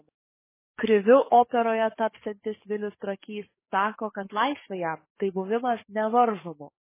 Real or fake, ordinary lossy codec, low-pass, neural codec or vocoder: fake; MP3, 16 kbps; 3.6 kHz; codec, 44.1 kHz, 7.8 kbps, DAC